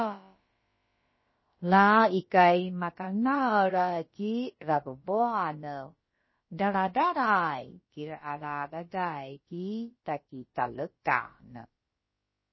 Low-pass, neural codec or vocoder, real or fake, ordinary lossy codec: 7.2 kHz; codec, 16 kHz, about 1 kbps, DyCAST, with the encoder's durations; fake; MP3, 24 kbps